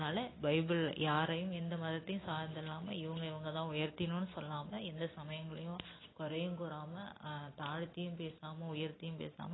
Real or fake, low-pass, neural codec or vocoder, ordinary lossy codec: real; 7.2 kHz; none; AAC, 16 kbps